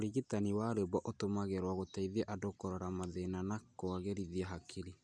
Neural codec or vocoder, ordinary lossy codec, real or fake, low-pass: none; none; real; 9.9 kHz